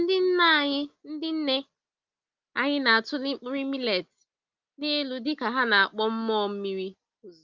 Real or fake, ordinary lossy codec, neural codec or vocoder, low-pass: real; Opus, 32 kbps; none; 7.2 kHz